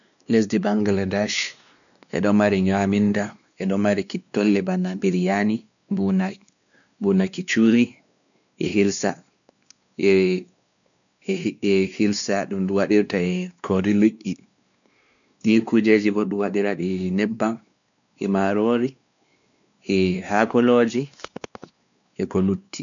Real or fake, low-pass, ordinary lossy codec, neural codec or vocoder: fake; 7.2 kHz; none; codec, 16 kHz, 2 kbps, X-Codec, WavLM features, trained on Multilingual LibriSpeech